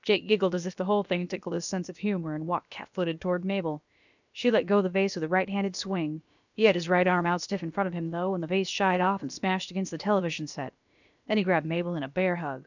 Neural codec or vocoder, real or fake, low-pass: codec, 16 kHz, about 1 kbps, DyCAST, with the encoder's durations; fake; 7.2 kHz